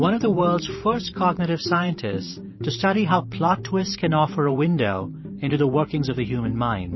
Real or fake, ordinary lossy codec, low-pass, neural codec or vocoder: fake; MP3, 24 kbps; 7.2 kHz; vocoder, 44.1 kHz, 128 mel bands every 512 samples, BigVGAN v2